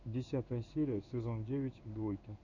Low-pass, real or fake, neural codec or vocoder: 7.2 kHz; fake; codec, 16 kHz in and 24 kHz out, 1 kbps, XY-Tokenizer